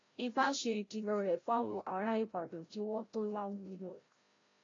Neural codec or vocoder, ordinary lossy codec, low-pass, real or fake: codec, 16 kHz, 0.5 kbps, FreqCodec, larger model; AAC, 32 kbps; 7.2 kHz; fake